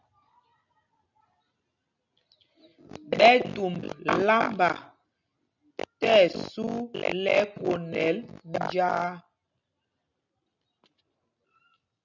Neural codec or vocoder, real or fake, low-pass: none; real; 7.2 kHz